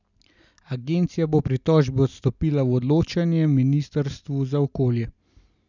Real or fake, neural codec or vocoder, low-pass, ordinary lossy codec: real; none; 7.2 kHz; none